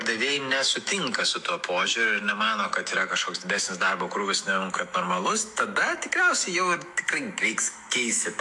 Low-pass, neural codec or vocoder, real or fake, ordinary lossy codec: 10.8 kHz; autoencoder, 48 kHz, 128 numbers a frame, DAC-VAE, trained on Japanese speech; fake; AAC, 64 kbps